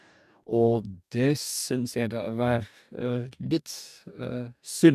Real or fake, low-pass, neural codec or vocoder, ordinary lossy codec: fake; 14.4 kHz; codec, 44.1 kHz, 2.6 kbps, DAC; none